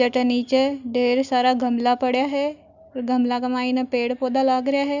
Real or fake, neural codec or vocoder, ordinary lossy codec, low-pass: real; none; none; 7.2 kHz